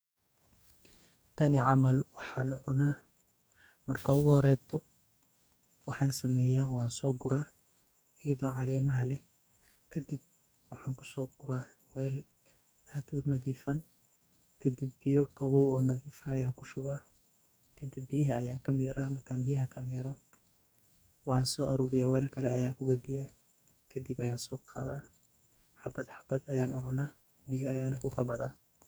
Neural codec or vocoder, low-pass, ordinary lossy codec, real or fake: codec, 44.1 kHz, 2.6 kbps, DAC; none; none; fake